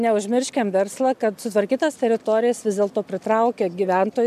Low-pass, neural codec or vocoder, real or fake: 14.4 kHz; none; real